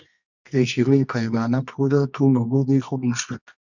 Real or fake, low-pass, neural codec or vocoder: fake; 7.2 kHz; codec, 24 kHz, 0.9 kbps, WavTokenizer, medium music audio release